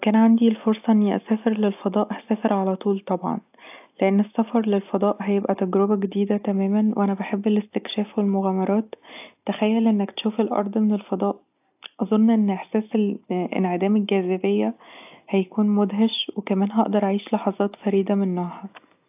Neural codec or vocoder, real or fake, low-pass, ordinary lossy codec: none; real; 3.6 kHz; AAC, 32 kbps